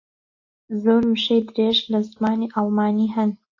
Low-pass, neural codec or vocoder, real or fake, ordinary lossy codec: 7.2 kHz; none; real; AAC, 48 kbps